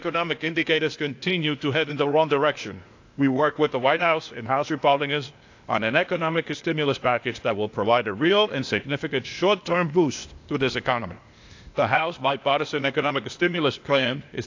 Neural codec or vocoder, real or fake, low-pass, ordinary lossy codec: codec, 16 kHz, 0.8 kbps, ZipCodec; fake; 7.2 kHz; AAC, 48 kbps